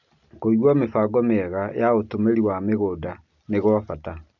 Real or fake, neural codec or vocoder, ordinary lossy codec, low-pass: real; none; none; 7.2 kHz